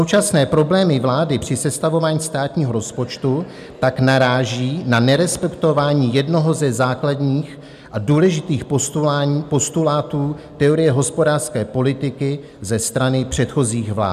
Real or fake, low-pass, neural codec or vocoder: real; 14.4 kHz; none